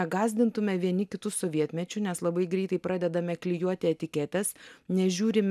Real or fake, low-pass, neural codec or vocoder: real; 14.4 kHz; none